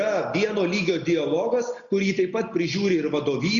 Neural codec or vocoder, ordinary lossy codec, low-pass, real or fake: none; Opus, 64 kbps; 7.2 kHz; real